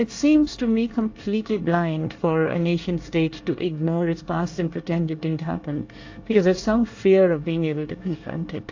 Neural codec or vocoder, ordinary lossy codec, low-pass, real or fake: codec, 24 kHz, 1 kbps, SNAC; AAC, 48 kbps; 7.2 kHz; fake